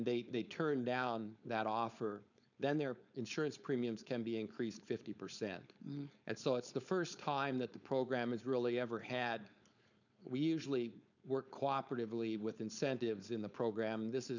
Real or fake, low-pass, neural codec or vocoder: fake; 7.2 kHz; codec, 16 kHz, 4.8 kbps, FACodec